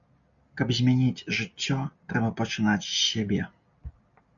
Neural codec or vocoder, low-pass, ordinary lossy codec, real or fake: none; 7.2 kHz; MP3, 96 kbps; real